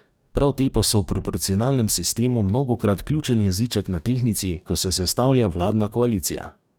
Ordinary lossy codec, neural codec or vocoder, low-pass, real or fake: none; codec, 44.1 kHz, 2.6 kbps, DAC; none; fake